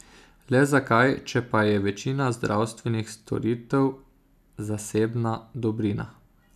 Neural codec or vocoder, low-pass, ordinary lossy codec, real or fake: none; 14.4 kHz; none; real